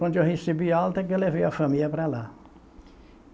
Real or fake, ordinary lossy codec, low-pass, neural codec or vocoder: real; none; none; none